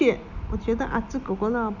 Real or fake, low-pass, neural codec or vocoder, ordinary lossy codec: real; 7.2 kHz; none; none